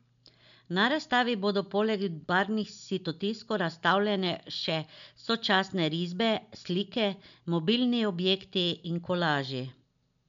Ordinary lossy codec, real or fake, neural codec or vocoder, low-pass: none; real; none; 7.2 kHz